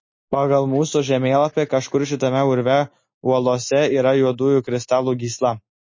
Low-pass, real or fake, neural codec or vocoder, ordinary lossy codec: 7.2 kHz; real; none; MP3, 32 kbps